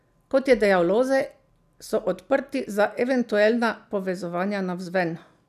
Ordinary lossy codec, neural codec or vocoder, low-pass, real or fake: none; none; 14.4 kHz; real